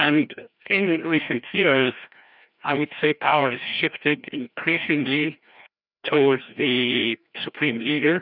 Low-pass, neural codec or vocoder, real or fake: 5.4 kHz; codec, 16 kHz, 1 kbps, FreqCodec, larger model; fake